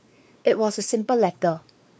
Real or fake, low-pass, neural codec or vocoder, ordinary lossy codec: fake; none; codec, 16 kHz, 4 kbps, X-Codec, WavLM features, trained on Multilingual LibriSpeech; none